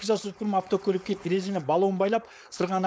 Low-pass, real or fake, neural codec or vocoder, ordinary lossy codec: none; fake; codec, 16 kHz, 4.8 kbps, FACodec; none